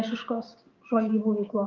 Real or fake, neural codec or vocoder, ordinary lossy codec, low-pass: fake; codec, 16 kHz, 6 kbps, DAC; Opus, 32 kbps; 7.2 kHz